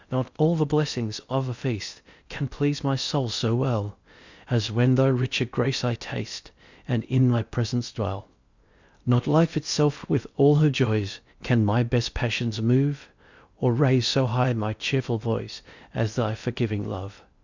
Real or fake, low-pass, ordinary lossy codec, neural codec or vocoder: fake; 7.2 kHz; Opus, 64 kbps; codec, 16 kHz in and 24 kHz out, 0.6 kbps, FocalCodec, streaming, 2048 codes